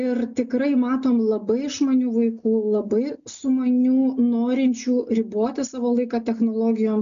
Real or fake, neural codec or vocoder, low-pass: real; none; 7.2 kHz